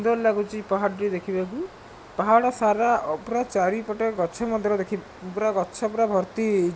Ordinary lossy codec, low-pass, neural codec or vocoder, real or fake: none; none; none; real